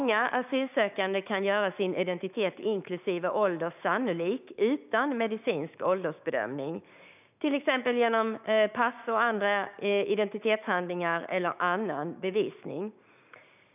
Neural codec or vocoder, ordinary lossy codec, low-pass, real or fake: codec, 16 kHz, 6 kbps, DAC; none; 3.6 kHz; fake